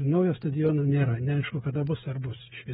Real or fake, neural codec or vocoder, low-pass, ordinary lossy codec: fake; codec, 16 kHz, 8 kbps, FreqCodec, smaller model; 7.2 kHz; AAC, 16 kbps